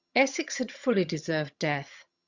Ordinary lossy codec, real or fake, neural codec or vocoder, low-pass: Opus, 64 kbps; fake; vocoder, 22.05 kHz, 80 mel bands, HiFi-GAN; 7.2 kHz